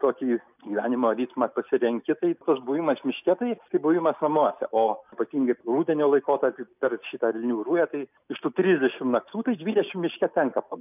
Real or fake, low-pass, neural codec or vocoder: real; 3.6 kHz; none